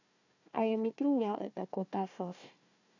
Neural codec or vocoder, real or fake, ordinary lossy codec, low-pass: codec, 16 kHz, 1 kbps, FunCodec, trained on Chinese and English, 50 frames a second; fake; none; 7.2 kHz